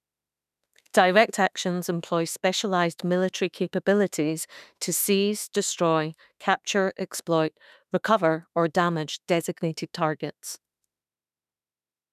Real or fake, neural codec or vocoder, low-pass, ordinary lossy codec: fake; autoencoder, 48 kHz, 32 numbers a frame, DAC-VAE, trained on Japanese speech; 14.4 kHz; none